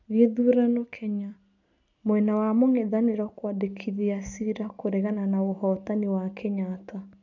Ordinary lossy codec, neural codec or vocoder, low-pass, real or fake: none; none; 7.2 kHz; real